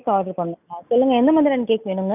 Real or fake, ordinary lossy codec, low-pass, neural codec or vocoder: real; none; 3.6 kHz; none